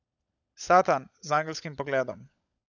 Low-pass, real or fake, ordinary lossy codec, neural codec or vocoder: 7.2 kHz; fake; none; codec, 16 kHz, 16 kbps, FunCodec, trained on LibriTTS, 50 frames a second